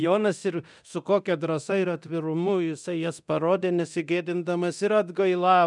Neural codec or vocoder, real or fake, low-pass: codec, 24 kHz, 0.9 kbps, DualCodec; fake; 10.8 kHz